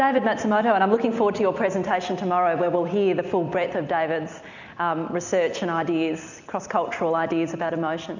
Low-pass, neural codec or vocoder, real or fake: 7.2 kHz; none; real